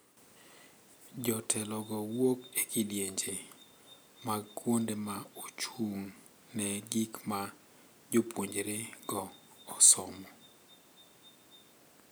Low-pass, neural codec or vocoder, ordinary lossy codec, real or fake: none; none; none; real